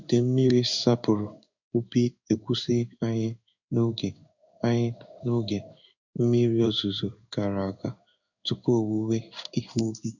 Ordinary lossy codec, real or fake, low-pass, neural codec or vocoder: none; fake; 7.2 kHz; codec, 16 kHz in and 24 kHz out, 1 kbps, XY-Tokenizer